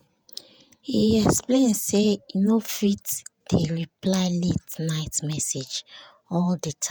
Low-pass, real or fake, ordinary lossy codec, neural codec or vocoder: none; fake; none; vocoder, 48 kHz, 128 mel bands, Vocos